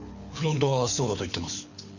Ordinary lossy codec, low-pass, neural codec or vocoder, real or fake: none; 7.2 kHz; codec, 24 kHz, 6 kbps, HILCodec; fake